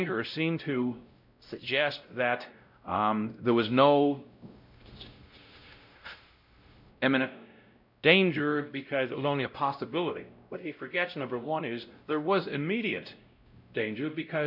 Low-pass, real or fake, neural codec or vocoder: 5.4 kHz; fake; codec, 16 kHz, 0.5 kbps, X-Codec, WavLM features, trained on Multilingual LibriSpeech